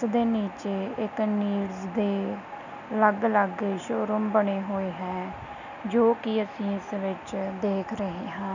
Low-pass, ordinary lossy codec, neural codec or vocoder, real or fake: 7.2 kHz; none; none; real